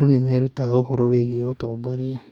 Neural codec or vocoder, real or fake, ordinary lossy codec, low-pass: codec, 44.1 kHz, 2.6 kbps, DAC; fake; none; 19.8 kHz